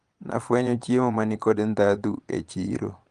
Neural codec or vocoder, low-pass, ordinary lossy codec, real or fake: vocoder, 22.05 kHz, 80 mel bands, Vocos; 9.9 kHz; Opus, 24 kbps; fake